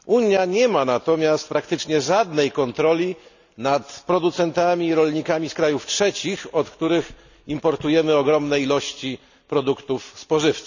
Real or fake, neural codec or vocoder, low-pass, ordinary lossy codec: real; none; 7.2 kHz; none